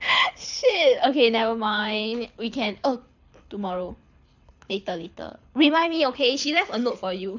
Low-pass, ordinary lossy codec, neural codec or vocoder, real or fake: 7.2 kHz; none; codec, 24 kHz, 6 kbps, HILCodec; fake